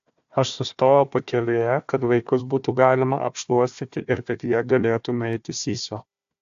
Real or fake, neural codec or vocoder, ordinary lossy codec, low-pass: fake; codec, 16 kHz, 1 kbps, FunCodec, trained on Chinese and English, 50 frames a second; AAC, 64 kbps; 7.2 kHz